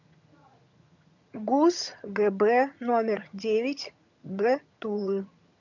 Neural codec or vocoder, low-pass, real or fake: vocoder, 22.05 kHz, 80 mel bands, HiFi-GAN; 7.2 kHz; fake